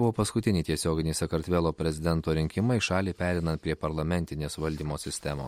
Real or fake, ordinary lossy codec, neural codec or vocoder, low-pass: real; MP3, 64 kbps; none; 19.8 kHz